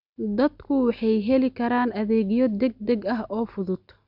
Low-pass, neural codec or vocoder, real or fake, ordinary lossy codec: 5.4 kHz; none; real; Opus, 64 kbps